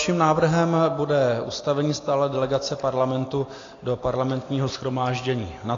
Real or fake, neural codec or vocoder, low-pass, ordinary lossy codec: real; none; 7.2 kHz; AAC, 32 kbps